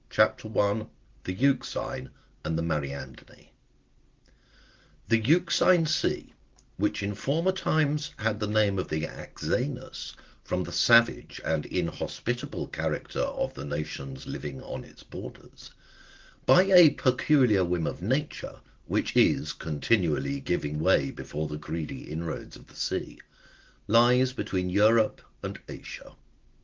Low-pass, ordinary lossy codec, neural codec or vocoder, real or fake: 7.2 kHz; Opus, 16 kbps; none; real